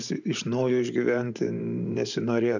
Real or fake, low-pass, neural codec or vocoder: fake; 7.2 kHz; vocoder, 44.1 kHz, 128 mel bands every 512 samples, BigVGAN v2